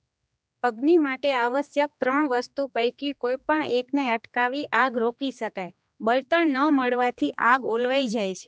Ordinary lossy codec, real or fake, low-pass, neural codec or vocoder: none; fake; none; codec, 16 kHz, 2 kbps, X-Codec, HuBERT features, trained on general audio